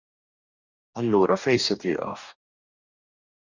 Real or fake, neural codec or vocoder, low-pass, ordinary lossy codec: fake; codec, 44.1 kHz, 2.6 kbps, DAC; 7.2 kHz; Opus, 64 kbps